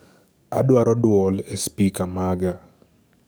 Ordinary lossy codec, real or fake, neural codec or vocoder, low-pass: none; fake; codec, 44.1 kHz, 7.8 kbps, DAC; none